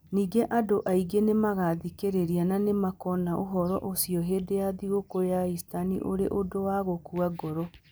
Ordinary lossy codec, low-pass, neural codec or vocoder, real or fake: none; none; none; real